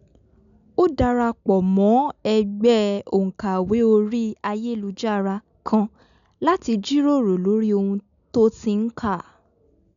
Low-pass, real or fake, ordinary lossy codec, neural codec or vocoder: 7.2 kHz; real; none; none